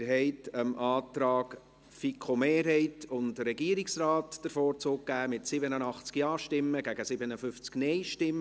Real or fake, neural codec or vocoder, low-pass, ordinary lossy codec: real; none; none; none